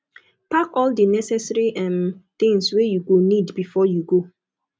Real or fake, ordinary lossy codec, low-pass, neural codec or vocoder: real; none; none; none